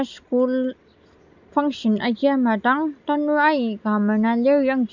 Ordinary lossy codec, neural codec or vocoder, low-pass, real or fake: none; none; 7.2 kHz; real